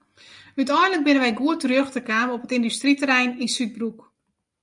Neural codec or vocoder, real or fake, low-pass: none; real; 10.8 kHz